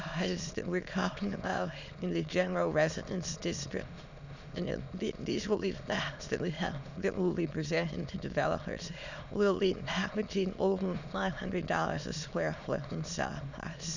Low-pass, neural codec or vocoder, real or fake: 7.2 kHz; autoencoder, 22.05 kHz, a latent of 192 numbers a frame, VITS, trained on many speakers; fake